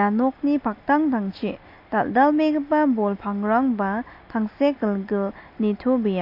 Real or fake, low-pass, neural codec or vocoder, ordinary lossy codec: real; 5.4 kHz; none; MP3, 32 kbps